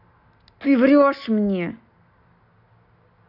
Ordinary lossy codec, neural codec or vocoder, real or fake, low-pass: none; none; real; 5.4 kHz